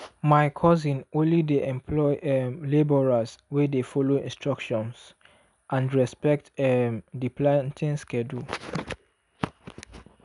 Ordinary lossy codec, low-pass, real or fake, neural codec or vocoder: none; 10.8 kHz; real; none